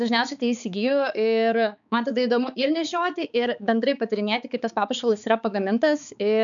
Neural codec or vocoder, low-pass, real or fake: codec, 16 kHz, 4 kbps, X-Codec, HuBERT features, trained on balanced general audio; 7.2 kHz; fake